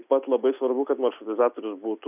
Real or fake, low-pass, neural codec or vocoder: real; 3.6 kHz; none